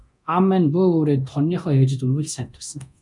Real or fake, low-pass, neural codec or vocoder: fake; 10.8 kHz; codec, 24 kHz, 0.9 kbps, DualCodec